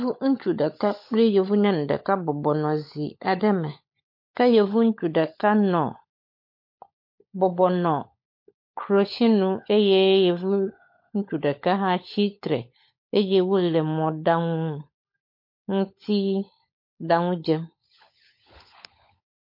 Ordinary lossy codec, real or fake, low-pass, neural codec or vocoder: MP3, 32 kbps; fake; 5.4 kHz; codec, 16 kHz, 16 kbps, FunCodec, trained on LibriTTS, 50 frames a second